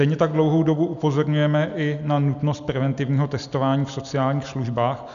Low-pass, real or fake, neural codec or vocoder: 7.2 kHz; real; none